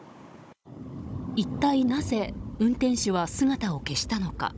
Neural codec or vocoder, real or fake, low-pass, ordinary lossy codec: codec, 16 kHz, 16 kbps, FunCodec, trained on Chinese and English, 50 frames a second; fake; none; none